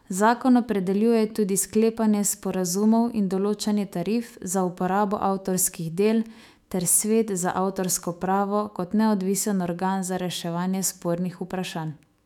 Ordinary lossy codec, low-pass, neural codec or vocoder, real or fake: none; 19.8 kHz; autoencoder, 48 kHz, 128 numbers a frame, DAC-VAE, trained on Japanese speech; fake